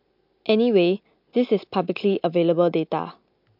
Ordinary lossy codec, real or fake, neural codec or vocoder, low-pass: MP3, 48 kbps; real; none; 5.4 kHz